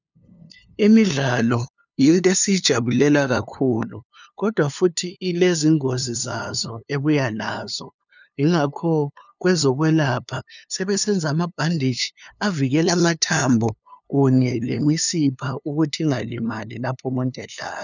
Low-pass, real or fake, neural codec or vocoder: 7.2 kHz; fake; codec, 16 kHz, 2 kbps, FunCodec, trained on LibriTTS, 25 frames a second